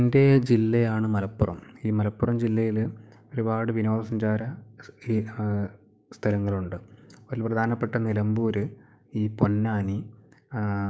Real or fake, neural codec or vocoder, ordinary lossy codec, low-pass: fake; codec, 16 kHz, 6 kbps, DAC; none; none